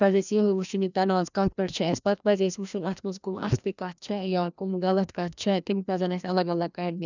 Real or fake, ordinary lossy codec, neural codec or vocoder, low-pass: fake; none; codec, 16 kHz, 1 kbps, FreqCodec, larger model; 7.2 kHz